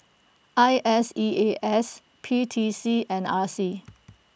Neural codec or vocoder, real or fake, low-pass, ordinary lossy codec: none; real; none; none